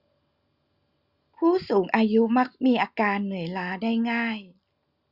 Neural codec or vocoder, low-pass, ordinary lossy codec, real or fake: none; 5.4 kHz; Opus, 64 kbps; real